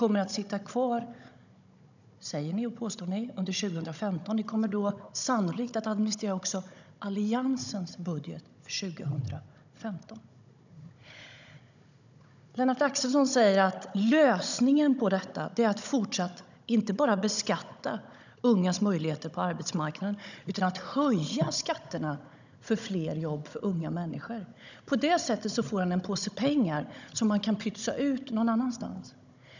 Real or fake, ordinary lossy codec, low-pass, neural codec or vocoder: fake; none; 7.2 kHz; codec, 16 kHz, 16 kbps, FunCodec, trained on Chinese and English, 50 frames a second